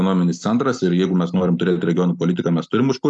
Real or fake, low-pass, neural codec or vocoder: real; 10.8 kHz; none